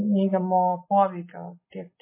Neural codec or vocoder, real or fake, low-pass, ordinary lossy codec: none; real; 3.6 kHz; MP3, 16 kbps